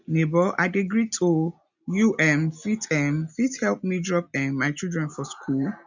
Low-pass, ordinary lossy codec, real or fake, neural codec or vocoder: 7.2 kHz; none; real; none